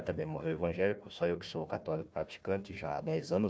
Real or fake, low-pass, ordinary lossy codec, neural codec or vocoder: fake; none; none; codec, 16 kHz, 1 kbps, FunCodec, trained on Chinese and English, 50 frames a second